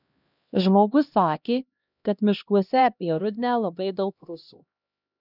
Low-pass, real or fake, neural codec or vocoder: 5.4 kHz; fake; codec, 16 kHz, 1 kbps, X-Codec, HuBERT features, trained on LibriSpeech